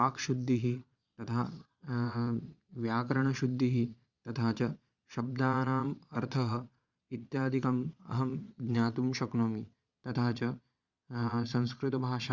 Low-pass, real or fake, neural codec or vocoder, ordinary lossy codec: 7.2 kHz; fake; vocoder, 22.05 kHz, 80 mel bands, Vocos; Opus, 64 kbps